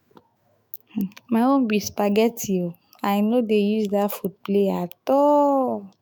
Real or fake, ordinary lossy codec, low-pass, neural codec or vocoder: fake; none; none; autoencoder, 48 kHz, 128 numbers a frame, DAC-VAE, trained on Japanese speech